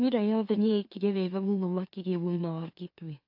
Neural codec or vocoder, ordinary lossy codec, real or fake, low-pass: autoencoder, 44.1 kHz, a latent of 192 numbers a frame, MeloTTS; AAC, 48 kbps; fake; 5.4 kHz